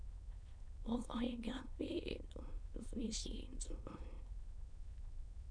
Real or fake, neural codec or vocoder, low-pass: fake; autoencoder, 22.05 kHz, a latent of 192 numbers a frame, VITS, trained on many speakers; 9.9 kHz